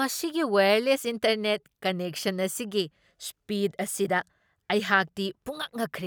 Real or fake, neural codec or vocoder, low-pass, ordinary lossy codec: real; none; none; none